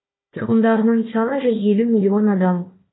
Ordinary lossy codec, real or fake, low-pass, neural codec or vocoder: AAC, 16 kbps; fake; 7.2 kHz; codec, 16 kHz, 1 kbps, FunCodec, trained on Chinese and English, 50 frames a second